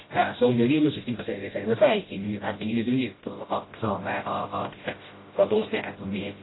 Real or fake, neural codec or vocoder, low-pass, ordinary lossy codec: fake; codec, 16 kHz, 0.5 kbps, FreqCodec, smaller model; 7.2 kHz; AAC, 16 kbps